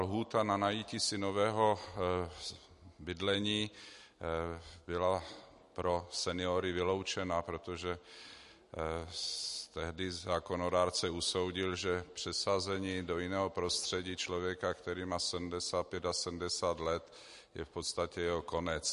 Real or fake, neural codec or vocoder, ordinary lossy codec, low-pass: real; none; MP3, 48 kbps; 14.4 kHz